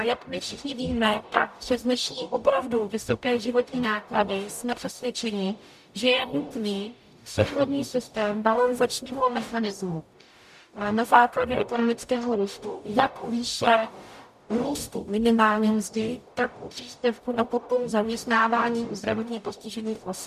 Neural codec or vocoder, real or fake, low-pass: codec, 44.1 kHz, 0.9 kbps, DAC; fake; 14.4 kHz